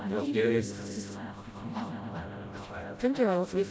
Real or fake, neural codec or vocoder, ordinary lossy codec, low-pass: fake; codec, 16 kHz, 0.5 kbps, FreqCodec, smaller model; none; none